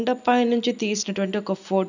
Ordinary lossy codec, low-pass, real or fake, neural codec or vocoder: none; 7.2 kHz; real; none